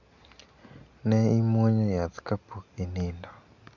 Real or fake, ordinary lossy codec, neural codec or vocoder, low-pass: real; none; none; 7.2 kHz